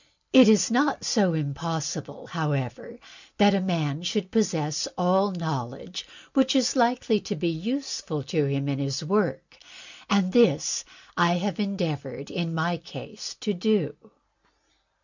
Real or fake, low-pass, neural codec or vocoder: real; 7.2 kHz; none